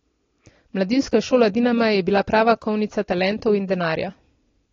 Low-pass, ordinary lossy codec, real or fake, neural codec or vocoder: 7.2 kHz; AAC, 32 kbps; real; none